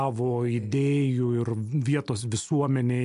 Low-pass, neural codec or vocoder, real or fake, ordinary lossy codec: 10.8 kHz; none; real; MP3, 64 kbps